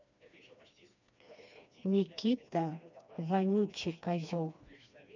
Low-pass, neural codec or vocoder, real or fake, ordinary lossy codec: 7.2 kHz; codec, 16 kHz, 2 kbps, FreqCodec, smaller model; fake; none